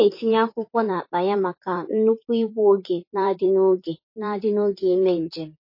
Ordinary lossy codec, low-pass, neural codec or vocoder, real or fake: MP3, 24 kbps; 5.4 kHz; vocoder, 44.1 kHz, 128 mel bands, Pupu-Vocoder; fake